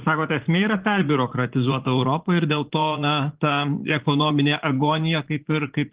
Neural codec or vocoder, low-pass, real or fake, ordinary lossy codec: vocoder, 44.1 kHz, 80 mel bands, Vocos; 3.6 kHz; fake; Opus, 16 kbps